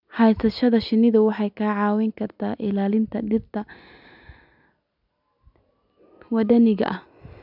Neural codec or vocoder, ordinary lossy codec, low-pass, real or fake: none; none; 5.4 kHz; real